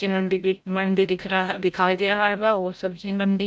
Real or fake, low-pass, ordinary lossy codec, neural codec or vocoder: fake; none; none; codec, 16 kHz, 0.5 kbps, FreqCodec, larger model